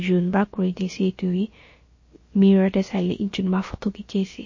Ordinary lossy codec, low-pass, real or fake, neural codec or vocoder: MP3, 32 kbps; 7.2 kHz; fake; codec, 16 kHz, about 1 kbps, DyCAST, with the encoder's durations